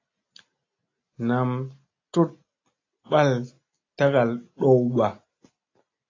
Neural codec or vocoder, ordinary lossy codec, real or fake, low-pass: none; AAC, 32 kbps; real; 7.2 kHz